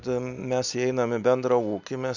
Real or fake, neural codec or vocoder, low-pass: real; none; 7.2 kHz